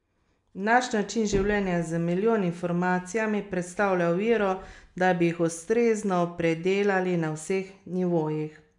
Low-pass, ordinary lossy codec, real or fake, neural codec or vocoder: 10.8 kHz; MP3, 96 kbps; real; none